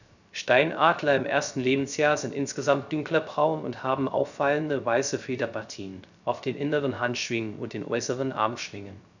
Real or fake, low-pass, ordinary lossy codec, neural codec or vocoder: fake; 7.2 kHz; none; codec, 16 kHz, 0.3 kbps, FocalCodec